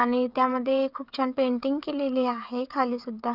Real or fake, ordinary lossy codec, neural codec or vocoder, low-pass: fake; none; codec, 16 kHz, 16 kbps, FreqCodec, smaller model; 5.4 kHz